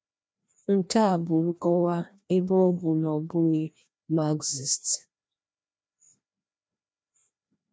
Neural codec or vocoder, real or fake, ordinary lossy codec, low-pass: codec, 16 kHz, 1 kbps, FreqCodec, larger model; fake; none; none